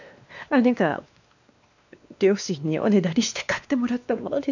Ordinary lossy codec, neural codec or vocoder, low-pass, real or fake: none; codec, 16 kHz, 2 kbps, X-Codec, WavLM features, trained on Multilingual LibriSpeech; 7.2 kHz; fake